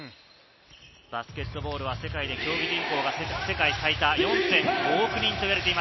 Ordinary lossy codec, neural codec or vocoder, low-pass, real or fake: MP3, 24 kbps; none; 7.2 kHz; real